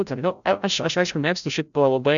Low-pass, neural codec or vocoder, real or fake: 7.2 kHz; codec, 16 kHz, 0.5 kbps, FreqCodec, larger model; fake